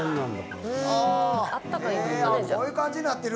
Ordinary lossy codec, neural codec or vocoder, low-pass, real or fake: none; none; none; real